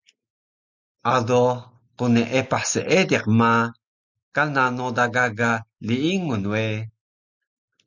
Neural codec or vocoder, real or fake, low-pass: none; real; 7.2 kHz